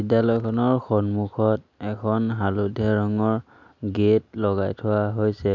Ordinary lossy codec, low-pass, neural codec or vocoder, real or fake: MP3, 64 kbps; 7.2 kHz; none; real